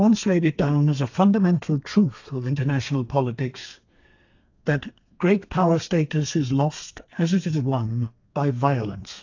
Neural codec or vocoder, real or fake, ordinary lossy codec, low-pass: codec, 32 kHz, 1.9 kbps, SNAC; fake; AAC, 48 kbps; 7.2 kHz